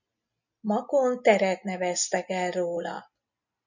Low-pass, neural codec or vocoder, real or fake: 7.2 kHz; none; real